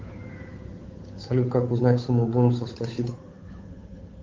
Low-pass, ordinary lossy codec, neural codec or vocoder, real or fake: 7.2 kHz; Opus, 32 kbps; codec, 16 kHz, 8 kbps, FunCodec, trained on Chinese and English, 25 frames a second; fake